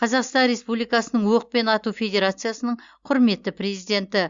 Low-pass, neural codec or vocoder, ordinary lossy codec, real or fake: 7.2 kHz; none; Opus, 64 kbps; real